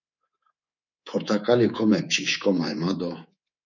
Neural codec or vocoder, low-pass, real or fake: codec, 24 kHz, 3.1 kbps, DualCodec; 7.2 kHz; fake